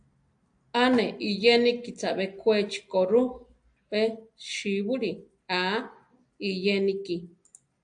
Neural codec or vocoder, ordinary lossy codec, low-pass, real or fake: none; AAC, 64 kbps; 9.9 kHz; real